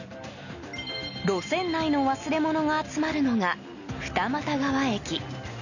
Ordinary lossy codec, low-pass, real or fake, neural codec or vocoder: none; 7.2 kHz; real; none